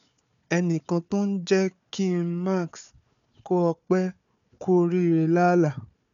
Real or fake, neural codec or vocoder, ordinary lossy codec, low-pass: fake; codec, 16 kHz, 4 kbps, FunCodec, trained on Chinese and English, 50 frames a second; none; 7.2 kHz